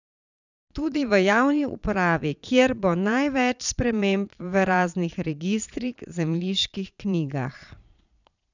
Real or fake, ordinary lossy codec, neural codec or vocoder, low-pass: fake; none; vocoder, 22.05 kHz, 80 mel bands, Vocos; 7.2 kHz